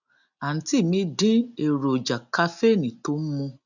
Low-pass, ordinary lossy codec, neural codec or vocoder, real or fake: 7.2 kHz; none; none; real